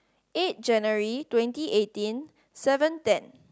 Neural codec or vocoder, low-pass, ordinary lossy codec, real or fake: none; none; none; real